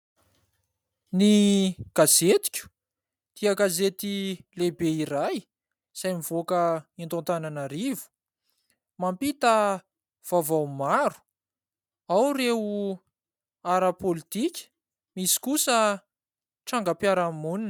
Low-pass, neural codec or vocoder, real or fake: 19.8 kHz; none; real